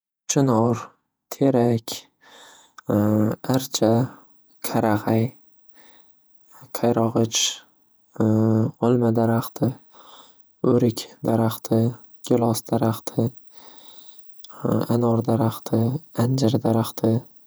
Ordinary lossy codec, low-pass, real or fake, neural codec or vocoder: none; none; fake; vocoder, 48 kHz, 128 mel bands, Vocos